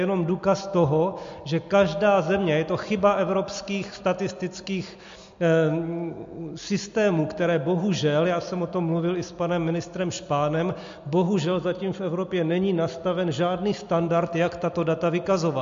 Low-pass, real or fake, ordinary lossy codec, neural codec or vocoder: 7.2 kHz; real; MP3, 48 kbps; none